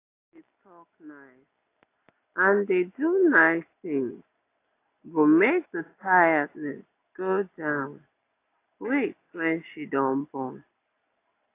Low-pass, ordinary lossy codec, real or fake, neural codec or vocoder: 3.6 kHz; AAC, 24 kbps; real; none